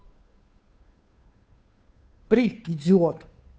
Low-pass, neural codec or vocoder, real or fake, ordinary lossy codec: none; codec, 16 kHz, 2 kbps, FunCodec, trained on Chinese and English, 25 frames a second; fake; none